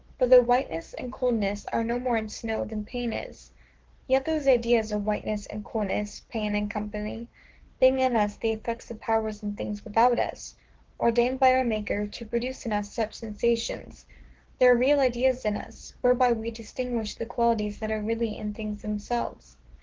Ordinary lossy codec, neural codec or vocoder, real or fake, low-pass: Opus, 16 kbps; codec, 44.1 kHz, 7.8 kbps, Pupu-Codec; fake; 7.2 kHz